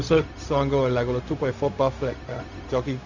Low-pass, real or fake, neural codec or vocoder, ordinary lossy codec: 7.2 kHz; fake; codec, 16 kHz, 0.4 kbps, LongCat-Audio-Codec; none